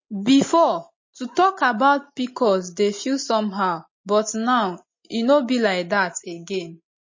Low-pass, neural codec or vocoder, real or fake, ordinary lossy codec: 7.2 kHz; none; real; MP3, 32 kbps